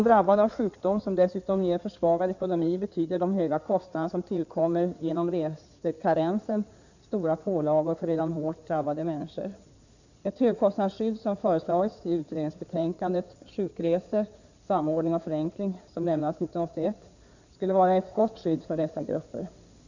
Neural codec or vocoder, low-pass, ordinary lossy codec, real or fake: codec, 16 kHz in and 24 kHz out, 2.2 kbps, FireRedTTS-2 codec; 7.2 kHz; none; fake